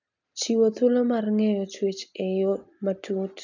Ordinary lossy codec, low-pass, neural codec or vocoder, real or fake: none; 7.2 kHz; none; real